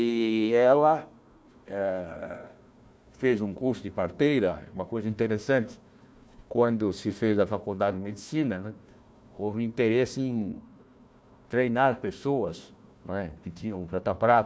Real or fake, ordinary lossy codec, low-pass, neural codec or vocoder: fake; none; none; codec, 16 kHz, 1 kbps, FunCodec, trained on Chinese and English, 50 frames a second